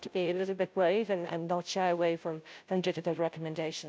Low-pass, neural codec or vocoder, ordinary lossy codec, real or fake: none; codec, 16 kHz, 0.5 kbps, FunCodec, trained on Chinese and English, 25 frames a second; none; fake